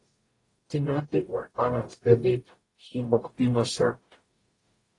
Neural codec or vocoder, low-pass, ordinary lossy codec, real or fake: codec, 44.1 kHz, 0.9 kbps, DAC; 10.8 kHz; AAC, 32 kbps; fake